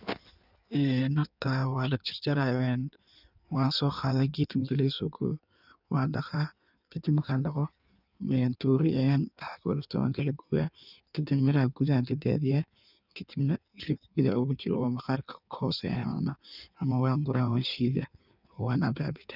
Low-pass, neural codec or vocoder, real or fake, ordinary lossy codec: 5.4 kHz; codec, 16 kHz in and 24 kHz out, 1.1 kbps, FireRedTTS-2 codec; fake; none